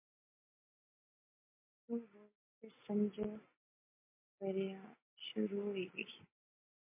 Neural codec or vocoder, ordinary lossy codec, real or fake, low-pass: none; MP3, 32 kbps; real; 3.6 kHz